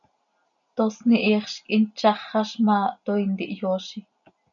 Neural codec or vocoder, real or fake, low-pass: none; real; 7.2 kHz